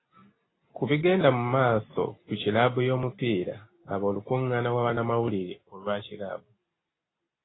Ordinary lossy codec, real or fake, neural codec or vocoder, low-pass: AAC, 16 kbps; real; none; 7.2 kHz